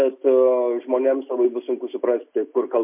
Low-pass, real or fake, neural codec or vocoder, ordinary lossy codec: 3.6 kHz; real; none; MP3, 32 kbps